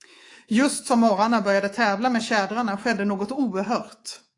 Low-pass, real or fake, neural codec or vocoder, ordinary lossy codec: 10.8 kHz; fake; codec, 24 kHz, 3.1 kbps, DualCodec; AAC, 48 kbps